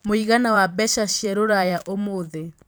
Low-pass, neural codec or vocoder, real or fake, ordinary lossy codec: none; vocoder, 44.1 kHz, 128 mel bands every 512 samples, BigVGAN v2; fake; none